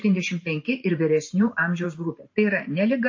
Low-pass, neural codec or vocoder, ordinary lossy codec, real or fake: 7.2 kHz; vocoder, 44.1 kHz, 128 mel bands every 512 samples, BigVGAN v2; MP3, 32 kbps; fake